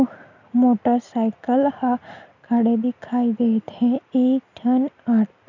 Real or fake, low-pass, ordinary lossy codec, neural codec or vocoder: real; 7.2 kHz; none; none